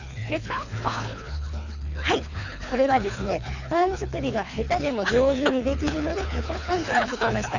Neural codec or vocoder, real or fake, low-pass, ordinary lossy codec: codec, 24 kHz, 3 kbps, HILCodec; fake; 7.2 kHz; none